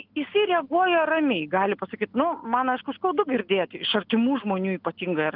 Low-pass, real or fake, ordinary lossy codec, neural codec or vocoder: 5.4 kHz; real; Opus, 32 kbps; none